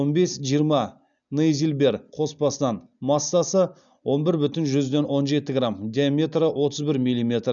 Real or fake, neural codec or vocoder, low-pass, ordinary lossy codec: real; none; 7.2 kHz; none